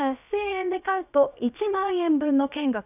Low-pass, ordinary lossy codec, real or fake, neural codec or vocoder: 3.6 kHz; none; fake; codec, 16 kHz, about 1 kbps, DyCAST, with the encoder's durations